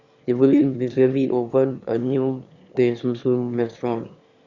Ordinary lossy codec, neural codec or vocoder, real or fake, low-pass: Opus, 64 kbps; autoencoder, 22.05 kHz, a latent of 192 numbers a frame, VITS, trained on one speaker; fake; 7.2 kHz